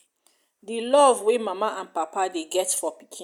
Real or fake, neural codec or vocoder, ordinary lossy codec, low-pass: real; none; none; 19.8 kHz